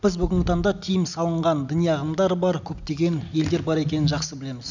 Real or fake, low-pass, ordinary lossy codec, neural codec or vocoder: real; 7.2 kHz; none; none